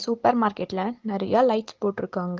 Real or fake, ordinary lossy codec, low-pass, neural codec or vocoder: real; Opus, 16 kbps; 7.2 kHz; none